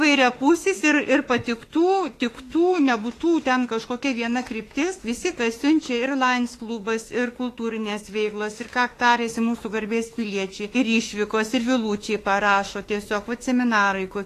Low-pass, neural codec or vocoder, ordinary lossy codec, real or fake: 14.4 kHz; autoencoder, 48 kHz, 32 numbers a frame, DAC-VAE, trained on Japanese speech; AAC, 48 kbps; fake